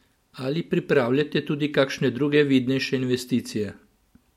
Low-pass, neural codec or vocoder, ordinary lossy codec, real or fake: 19.8 kHz; none; MP3, 64 kbps; real